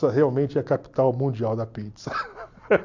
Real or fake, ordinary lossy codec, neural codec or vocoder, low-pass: real; AAC, 48 kbps; none; 7.2 kHz